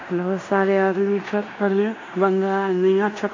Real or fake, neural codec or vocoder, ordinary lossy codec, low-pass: fake; codec, 16 kHz in and 24 kHz out, 0.9 kbps, LongCat-Audio-Codec, fine tuned four codebook decoder; AAC, 48 kbps; 7.2 kHz